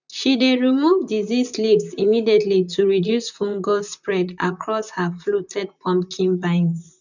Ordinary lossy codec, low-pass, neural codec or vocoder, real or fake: none; 7.2 kHz; vocoder, 44.1 kHz, 128 mel bands, Pupu-Vocoder; fake